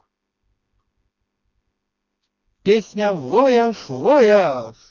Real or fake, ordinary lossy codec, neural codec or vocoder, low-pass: fake; none; codec, 16 kHz, 2 kbps, FreqCodec, smaller model; 7.2 kHz